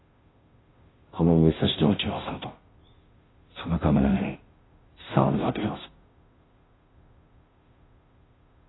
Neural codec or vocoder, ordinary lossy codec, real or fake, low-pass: codec, 16 kHz, 0.5 kbps, FunCodec, trained on Chinese and English, 25 frames a second; AAC, 16 kbps; fake; 7.2 kHz